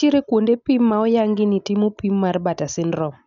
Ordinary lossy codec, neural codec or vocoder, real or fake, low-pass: none; none; real; 7.2 kHz